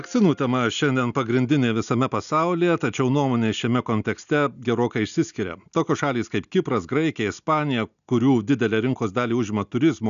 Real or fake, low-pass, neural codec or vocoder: real; 7.2 kHz; none